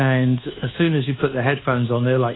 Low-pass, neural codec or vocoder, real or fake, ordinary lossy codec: 7.2 kHz; codec, 24 kHz, 1.2 kbps, DualCodec; fake; AAC, 16 kbps